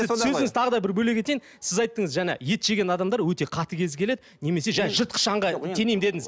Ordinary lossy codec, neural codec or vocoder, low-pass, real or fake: none; none; none; real